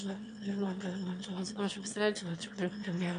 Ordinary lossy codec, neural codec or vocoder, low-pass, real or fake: MP3, 64 kbps; autoencoder, 22.05 kHz, a latent of 192 numbers a frame, VITS, trained on one speaker; 9.9 kHz; fake